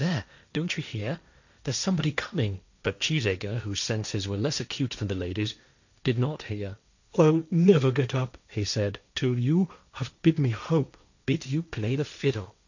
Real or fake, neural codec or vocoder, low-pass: fake; codec, 16 kHz, 1.1 kbps, Voila-Tokenizer; 7.2 kHz